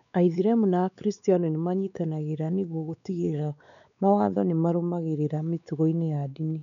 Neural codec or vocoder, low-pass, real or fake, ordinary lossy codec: codec, 16 kHz, 4 kbps, X-Codec, WavLM features, trained on Multilingual LibriSpeech; 7.2 kHz; fake; none